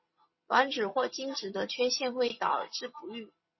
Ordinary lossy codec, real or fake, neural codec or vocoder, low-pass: MP3, 24 kbps; real; none; 7.2 kHz